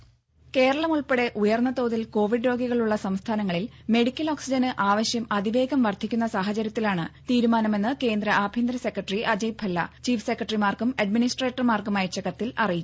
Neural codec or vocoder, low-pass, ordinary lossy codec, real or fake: none; none; none; real